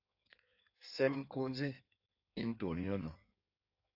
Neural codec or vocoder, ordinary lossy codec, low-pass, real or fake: codec, 16 kHz in and 24 kHz out, 1.1 kbps, FireRedTTS-2 codec; AAC, 48 kbps; 5.4 kHz; fake